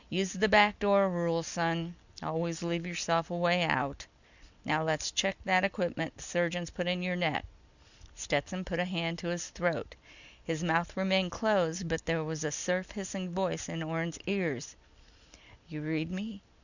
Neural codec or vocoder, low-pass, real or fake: none; 7.2 kHz; real